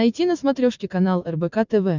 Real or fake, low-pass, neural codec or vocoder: real; 7.2 kHz; none